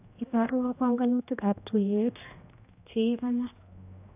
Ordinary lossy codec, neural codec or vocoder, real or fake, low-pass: AAC, 32 kbps; codec, 16 kHz, 1 kbps, X-Codec, HuBERT features, trained on general audio; fake; 3.6 kHz